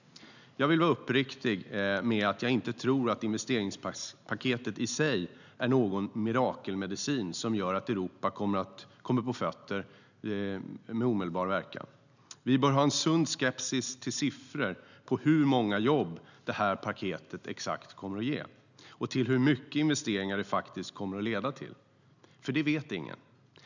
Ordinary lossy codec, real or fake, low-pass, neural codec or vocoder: none; real; 7.2 kHz; none